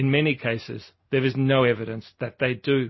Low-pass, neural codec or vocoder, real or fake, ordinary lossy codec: 7.2 kHz; none; real; MP3, 24 kbps